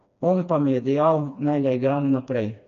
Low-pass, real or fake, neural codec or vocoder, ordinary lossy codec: 7.2 kHz; fake; codec, 16 kHz, 2 kbps, FreqCodec, smaller model; none